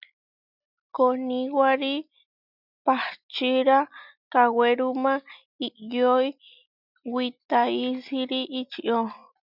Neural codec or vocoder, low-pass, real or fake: none; 5.4 kHz; real